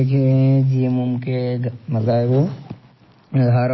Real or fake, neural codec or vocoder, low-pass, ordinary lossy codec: real; none; 7.2 kHz; MP3, 24 kbps